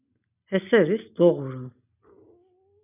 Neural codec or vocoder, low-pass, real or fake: none; 3.6 kHz; real